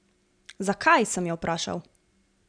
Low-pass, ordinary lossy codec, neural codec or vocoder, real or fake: 9.9 kHz; none; none; real